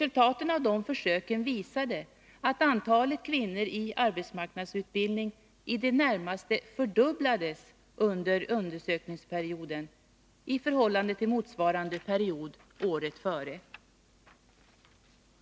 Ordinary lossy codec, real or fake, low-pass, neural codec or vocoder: none; real; none; none